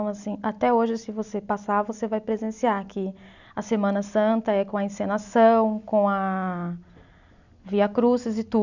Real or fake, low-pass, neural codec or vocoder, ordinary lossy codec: real; 7.2 kHz; none; none